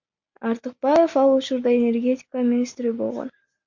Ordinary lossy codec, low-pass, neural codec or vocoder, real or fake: MP3, 48 kbps; 7.2 kHz; none; real